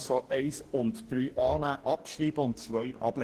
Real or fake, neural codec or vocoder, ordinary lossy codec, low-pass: fake; codec, 44.1 kHz, 2.6 kbps, DAC; Opus, 16 kbps; 14.4 kHz